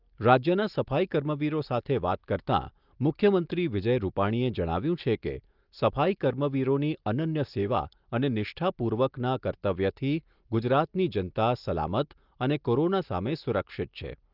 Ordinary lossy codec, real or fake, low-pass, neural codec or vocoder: Opus, 24 kbps; real; 5.4 kHz; none